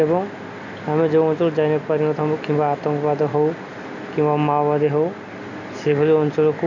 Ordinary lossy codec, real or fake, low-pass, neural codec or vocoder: none; real; 7.2 kHz; none